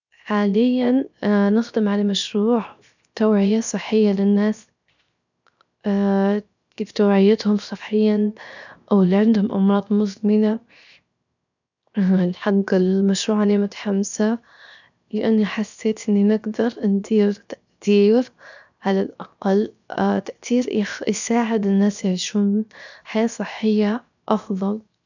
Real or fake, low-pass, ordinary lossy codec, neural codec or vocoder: fake; 7.2 kHz; none; codec, 16 kHz, 0.7 kbps, FocalCodec